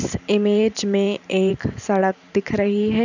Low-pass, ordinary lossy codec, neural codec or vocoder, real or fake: 7.2 kHz; none; vocoder, 44.1 kHz, 128 mel bands every 512 samples, BigVGAN v2; fake